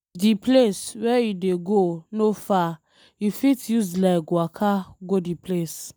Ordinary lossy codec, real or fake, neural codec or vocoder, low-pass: none; real; none; none